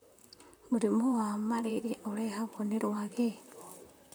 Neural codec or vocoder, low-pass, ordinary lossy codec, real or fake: vocoder, 44.1 kHz, 128 mel bands, Pupu-Vocoder; none; none; fake